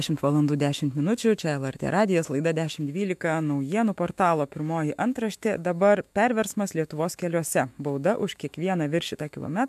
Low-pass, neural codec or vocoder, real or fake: 14.4 kHz; autoencoder, 48 kHz, 128 numbers a frame, DAC-VAE, trained on Japanese speech; fake